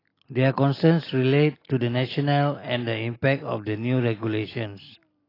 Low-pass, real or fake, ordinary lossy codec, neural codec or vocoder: 5.4 kHz; real; AAC, 24 kbps; none